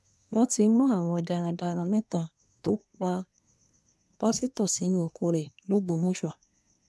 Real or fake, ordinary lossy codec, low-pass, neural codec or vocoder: fake; none; none; codec, 24 kHz, 1 kbps, SNAC